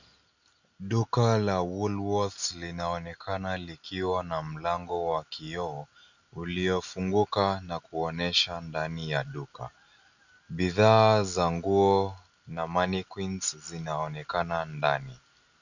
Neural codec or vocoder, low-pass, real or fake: none; 7.2 kHz; real